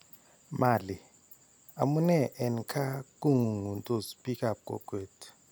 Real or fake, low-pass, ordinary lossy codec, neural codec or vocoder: real; none; none; none